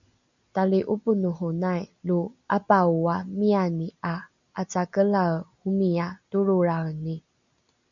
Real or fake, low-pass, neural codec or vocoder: real; 7.2 kHz; none